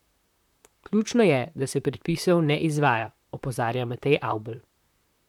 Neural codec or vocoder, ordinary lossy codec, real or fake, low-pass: vocoder, 44.1 kHz, 128 mel bands, Pupu-Vocoder; none; fake; 19.8 kHz